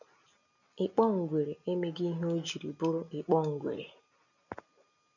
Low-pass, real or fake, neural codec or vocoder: 7.2 kHz; real; none